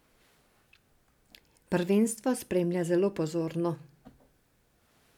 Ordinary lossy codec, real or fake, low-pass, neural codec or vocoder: none; real; 19.8 kHz; none